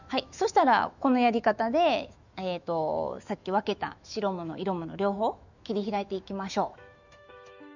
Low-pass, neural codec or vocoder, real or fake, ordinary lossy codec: 7.2 kHz; autoencoder, 48 kHz, 128 numbers a frame, DAC-VAE, trained on Japanese speech; fake; none